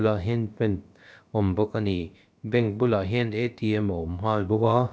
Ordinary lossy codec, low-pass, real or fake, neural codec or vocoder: none; none; fake; codec, 16 kHz, about 1 kbps, DyCAST, with the encoder's durations